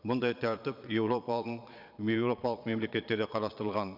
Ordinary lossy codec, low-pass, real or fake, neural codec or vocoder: none; 5.4 kHz; fake; vocoder, 22.05 kHz, 80 mel bands, Vocos